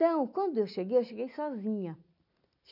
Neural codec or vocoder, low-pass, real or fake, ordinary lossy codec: none; 5.4 kHz; real; none